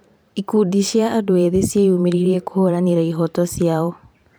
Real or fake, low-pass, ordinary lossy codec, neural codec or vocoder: fake; none; none; vocoder, 44.1 kHz, 128 mel bands every 512 samples, BigVGAN v2